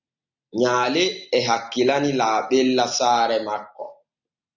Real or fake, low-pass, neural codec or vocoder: real; 7.2 kHz; none